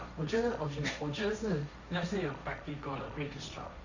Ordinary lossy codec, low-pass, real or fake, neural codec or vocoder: none; 7.2 kHz; fake; codec, 16 kHz, 1.1 kbps, Voila-Tokenizer